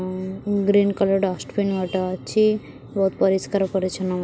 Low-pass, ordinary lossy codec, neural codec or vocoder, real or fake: none; none; none; real